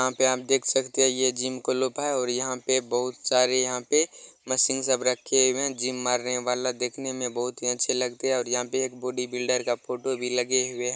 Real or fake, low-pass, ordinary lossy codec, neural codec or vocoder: real; none; none; none